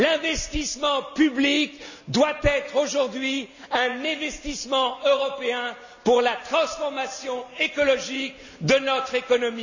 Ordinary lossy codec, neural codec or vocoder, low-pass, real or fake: none; none; 7.2 kHz; real